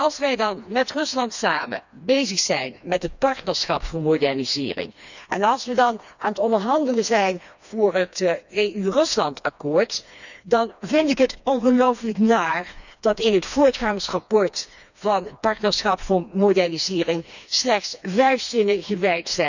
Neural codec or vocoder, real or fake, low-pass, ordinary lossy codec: codec, 16 kHz, 2 kbps, FreqCodec, smaller model; fake; 7.2 kHz; none